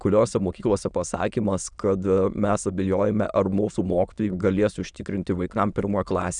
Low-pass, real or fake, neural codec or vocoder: 9.9 kHz; fake; autoencoder, 22.05 kHz, a latent of 192 numbers a frame, VITS, trained on many speakers